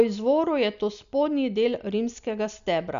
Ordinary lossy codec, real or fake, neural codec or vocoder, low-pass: none; real; none; 7.2 kHz